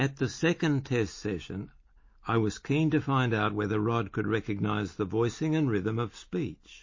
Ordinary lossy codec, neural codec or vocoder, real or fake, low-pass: MP3, 32 kbps; none; real; 7.2 kHz